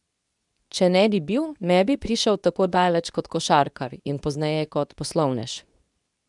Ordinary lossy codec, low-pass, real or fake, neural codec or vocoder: none; 10.8 kHz; fake; codec, 24 kHz, 0.9 kbps, WavTokenizer, medium speech release version 2